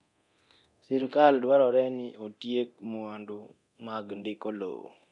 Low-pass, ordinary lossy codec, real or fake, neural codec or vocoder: 10.8 kHz; none; fake; codec, 24 kHz, 0.9 kbps, DualCodec